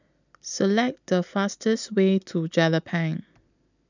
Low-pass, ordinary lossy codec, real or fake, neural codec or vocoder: 7.2 kHz; none; real; none